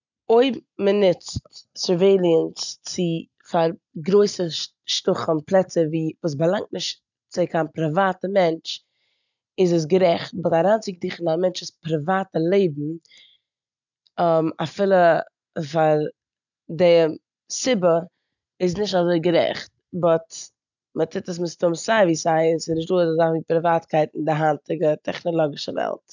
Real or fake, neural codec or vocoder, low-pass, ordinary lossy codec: real; none; 7.2 kHz; none